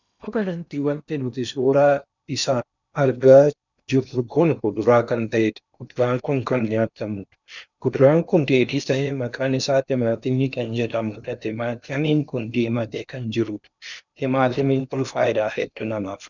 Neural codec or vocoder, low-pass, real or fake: codec, 16 kHz in and 24 kHz out, 0.8 kbps, FocalCodec, streaming, 65536 codes; 7.2 kHz; fake